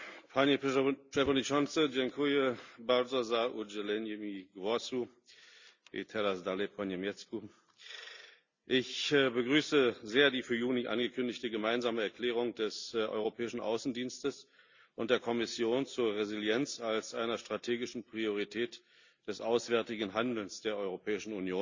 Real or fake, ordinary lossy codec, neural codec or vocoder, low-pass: real; Opus, 64 kbps; none; 7.2 kHz